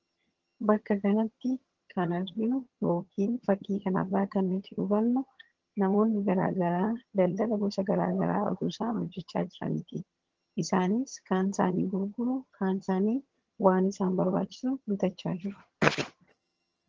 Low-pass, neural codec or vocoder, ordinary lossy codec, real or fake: 7.2 kHz; vocoder, 22.05 kHz, 80 mel bands, HiFi-GAN; Opus, 16 kbps; fake